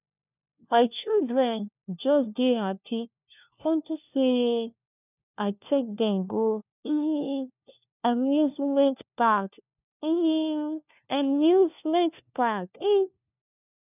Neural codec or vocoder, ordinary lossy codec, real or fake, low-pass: codec, 16 kHz, 1 kbps, FunCodec, trained on LibriTTS, 50 frames a second; none; fake; 3.6 kHz